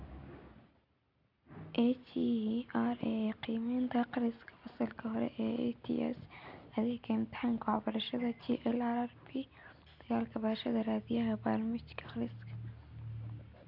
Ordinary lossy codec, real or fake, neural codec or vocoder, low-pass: none; real; none; 5.4 kHz